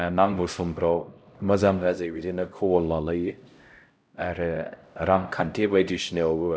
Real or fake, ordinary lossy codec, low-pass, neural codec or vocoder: fake; none; none; codec, 16 kHz, 0.5 kbps, X-Codec, HuBERT features, trained on LibriSpeech